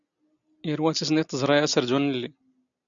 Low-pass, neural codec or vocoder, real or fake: 7.2 kHz; none; real